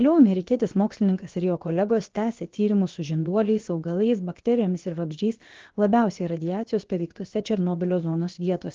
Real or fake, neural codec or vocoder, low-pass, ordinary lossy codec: fake; codec, 16 kHz, about 1 kbps, DyCAST, with the encoder's durations; 7.2 kHz; Opus, 16 kbps